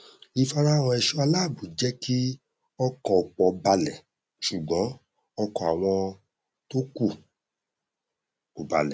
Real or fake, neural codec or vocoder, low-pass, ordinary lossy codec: real; none; none; none